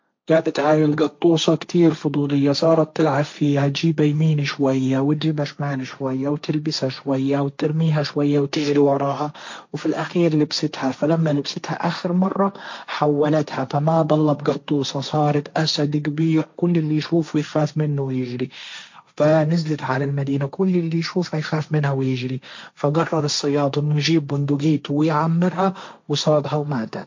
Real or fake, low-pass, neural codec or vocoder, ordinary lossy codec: fake; 7.2 kHz; codec, 16 kHz, 1.1 kbps, Voila-Tokenizer; MP3, 48 kbps